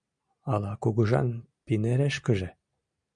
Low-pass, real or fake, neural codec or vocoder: 9.9 kHz; real; none